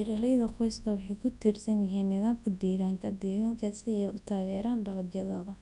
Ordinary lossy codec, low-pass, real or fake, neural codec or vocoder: none; 10.8 kHz; fake; codec, 24 kHz, 0.9 kbps, WavTokenizer, large speech release